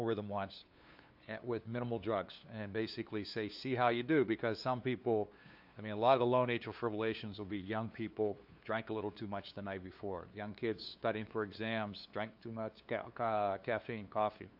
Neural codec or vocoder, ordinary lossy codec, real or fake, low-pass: codec, 16 kHz, 2 kbps, FunCodec, trained on LibriTTS, 25 frames a second; AAC, 48 kbps; fake; 5.4 kHz